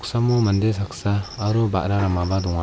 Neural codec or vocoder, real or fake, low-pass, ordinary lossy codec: none; real; none; none